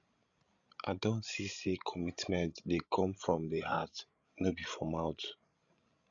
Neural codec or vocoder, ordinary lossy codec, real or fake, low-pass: codec, 16 kHz, 16 kbps, FreqCodec, larger model; none; fake; 7.2 kHz